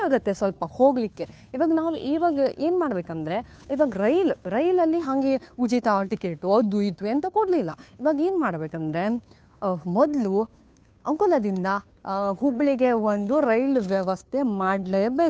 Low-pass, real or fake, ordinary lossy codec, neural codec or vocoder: none; fake; none; codec, 16 kHz, 2 kbps, FunCodec, trained on Chinese and English, 25 frames a second